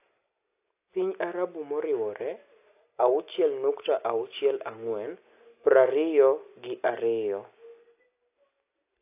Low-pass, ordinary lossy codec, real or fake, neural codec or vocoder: 3.6 kHz; AAC, 24 kbps; real; none